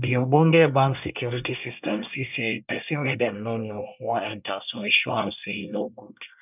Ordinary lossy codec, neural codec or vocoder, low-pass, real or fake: none; codec, 24 kHz, 1 kbps, SNAC; 3.6 kHz; fake